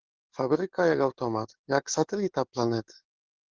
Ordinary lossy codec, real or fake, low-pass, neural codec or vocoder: Opus, 16 kbps; fake; 7.2 kHz; codec, 44.1 kHz, 7.8 kbps, DAC